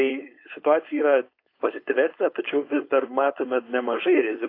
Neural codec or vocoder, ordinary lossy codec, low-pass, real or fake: codec, 16 kHz, 4.8 kbps, FACodec; AAC, 32 kbps; 5.4 kHz; fake